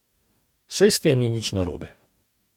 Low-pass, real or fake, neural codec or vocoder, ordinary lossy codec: 19.8 kHz; fake; codec, 44.1 kHz, 2.6 kbps, DAC; MP3, 96 kbps